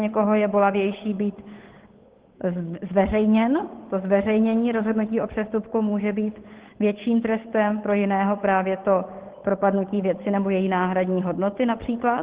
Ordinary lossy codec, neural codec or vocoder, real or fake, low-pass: Opus, 16 kbps; codec, 16 kHz, 8 kbps, FunCodec, trained on Chinese and English, 25 frames a second; fake; 3.6 kHz